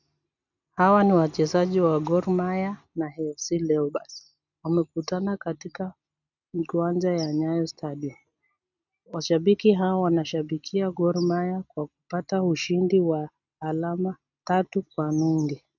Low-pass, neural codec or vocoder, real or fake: 7.2 kHz; none; real